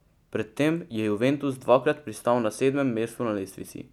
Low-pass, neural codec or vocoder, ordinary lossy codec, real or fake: 19.8 kHz; none; none; real